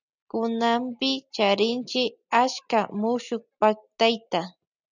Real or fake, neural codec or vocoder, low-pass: real; none; 7.2 kHz